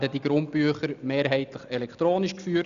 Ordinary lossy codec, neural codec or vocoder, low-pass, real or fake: none; none; 7.2 kHz; real